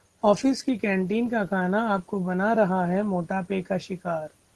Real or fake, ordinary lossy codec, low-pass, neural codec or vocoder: real; Opus, 16 kbps; 10.8 kHz; none